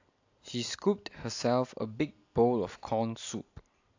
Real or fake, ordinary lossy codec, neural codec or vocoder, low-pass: real; AAC, 48 kbps; none; 7.2 kHz